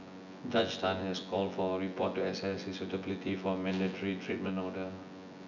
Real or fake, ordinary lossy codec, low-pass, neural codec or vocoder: fake; none; 7.2 kHz; vocoder, 24 kHz, 100 mel bands, Vocos